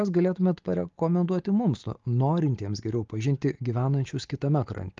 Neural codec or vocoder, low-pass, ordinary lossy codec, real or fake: none; 7.2 kHz; Opus, 32 kbps; real